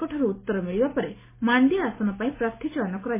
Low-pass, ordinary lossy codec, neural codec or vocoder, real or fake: 3.6 kHz; MP3, 16 kbps; none; real